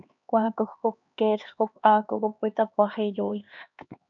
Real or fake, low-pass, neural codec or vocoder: fake; 7.2 kHz; codec, 16 kHz, 2 kbps, X-Codec, HuBERT features, trained on LibriSpeech